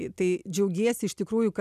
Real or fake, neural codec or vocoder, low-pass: real; none; 14.4 kHz